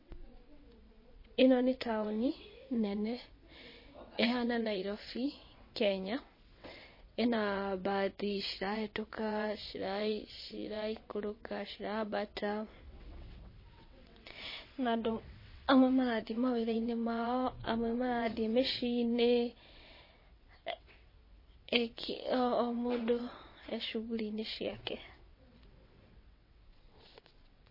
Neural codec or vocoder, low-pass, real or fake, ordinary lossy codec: vocoder, 22.05 kHz, 80 mel bands, WaveNeXt; 5.4 kHz; fake; MP3, 24 kbps